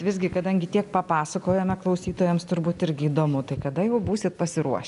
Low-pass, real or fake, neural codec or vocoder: 10.8 kHz; real; none